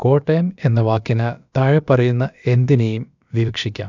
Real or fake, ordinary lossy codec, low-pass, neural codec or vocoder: fake; none; 7.2 kHz; codec, 16 kHz, about 1 kbps, DyCAST, with the encoder's durations